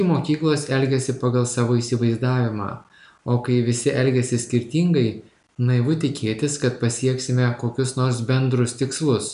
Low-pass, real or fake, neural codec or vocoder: 10.8 kHz; real; none